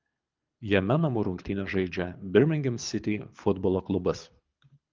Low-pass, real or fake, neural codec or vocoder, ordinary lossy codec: 7.2 kHz; fake; codec, 24 kHz, 6 kbps, HILCodec; Opus, 32 kbps